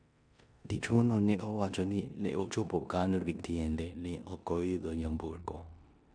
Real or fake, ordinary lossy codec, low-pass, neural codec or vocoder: fake; none; 9.9 kHz; codec, 16 kHz in and 24 kHz out, 0.9 kbps, LongCat-Audio-Codec, four codebook decoder